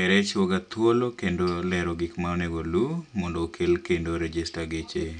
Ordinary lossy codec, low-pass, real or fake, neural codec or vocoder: none; 9.9 kHz; real; none